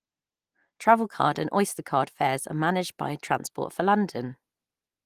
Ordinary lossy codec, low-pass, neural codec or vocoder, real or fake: Opus, 24 kbps; 14.4 kHz; none; real